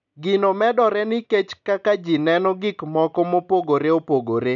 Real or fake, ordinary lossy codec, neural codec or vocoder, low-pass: real; none; none; 7.2 kHz